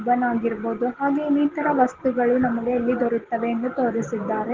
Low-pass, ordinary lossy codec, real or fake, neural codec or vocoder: 7.2 kHz; Opus, 16 kbps; real; none